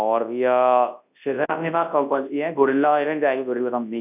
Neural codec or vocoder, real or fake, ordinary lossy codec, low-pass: codec, 24 kHz, 0.9 kbps, WavTokenizer, large speech release; fake; none; 3.6 kHz